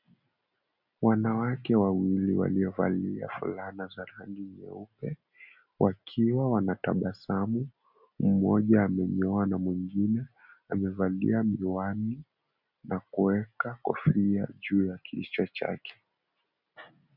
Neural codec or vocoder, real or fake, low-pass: none; real; 5.4 kHz